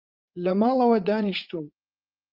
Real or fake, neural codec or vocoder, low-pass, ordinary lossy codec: fake; vocoder, 44.1 kHz, 80 mel bands, Vocos; 5.4 kHz; Opus, 32 kbps